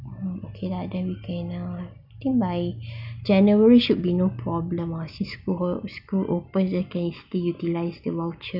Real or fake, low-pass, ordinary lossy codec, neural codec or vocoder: real; 5.4 kHz; none; none